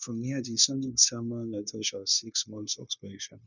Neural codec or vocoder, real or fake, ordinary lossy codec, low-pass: codec, 16 kHz, 0.9 kbps, LongCat-Audio-Codec; fake; none; 7.2 kHz